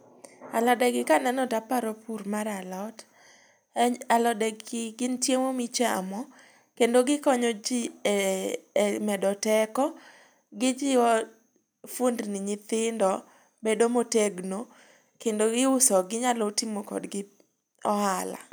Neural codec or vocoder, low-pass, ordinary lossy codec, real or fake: none; none; none; real